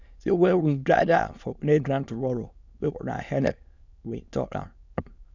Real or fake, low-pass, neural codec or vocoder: fake; 7.2 kHz; autoencoder, 22.05 kHz, a latent of 192 numbers a frame, VITS, trained on many speakers